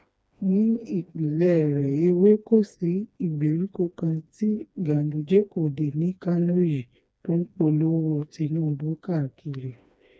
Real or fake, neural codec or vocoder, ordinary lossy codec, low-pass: fake; codec, 16 kHz, 2 kbps, FreqCodec, smaller model; none; none